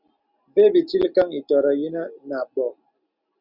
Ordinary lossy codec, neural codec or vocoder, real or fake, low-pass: Opus, 64 kbps; none; real; 5.4 kHz